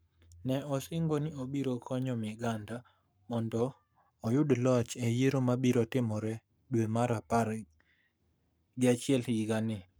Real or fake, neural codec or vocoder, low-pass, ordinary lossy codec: fake; codec, 44.1 kHz, 7.8 kbps, Pupu-Codec; none; none